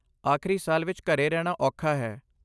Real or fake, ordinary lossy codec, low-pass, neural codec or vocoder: real; none; none; none